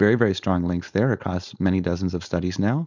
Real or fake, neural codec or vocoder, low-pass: fake; codec, 16 kHz, 4.8 kbps, FACodec; 7.2 kHz